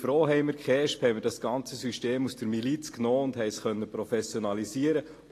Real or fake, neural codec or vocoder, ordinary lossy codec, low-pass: real; none; AAC, 48 kbps; 14.4 kHz